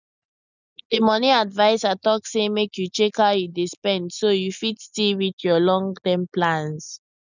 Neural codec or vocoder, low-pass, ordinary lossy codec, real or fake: none; 7.2 kHz; none; real